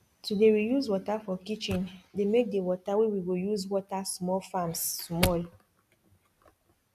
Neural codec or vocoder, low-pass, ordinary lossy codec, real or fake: none; 14.4 kHz; none; real